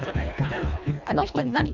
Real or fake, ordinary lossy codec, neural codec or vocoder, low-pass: fake; none; codec, 24 kHz, 1.5 kbps, HILCodec; 7.2 kHz